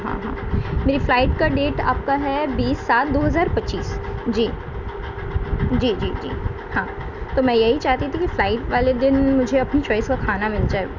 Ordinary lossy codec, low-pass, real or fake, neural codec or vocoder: none; 7.2 kHz; real; none